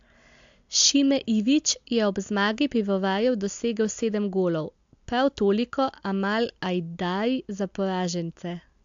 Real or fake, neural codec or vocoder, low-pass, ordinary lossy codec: real; none; 7.2 kHz; AAC, 64 kbps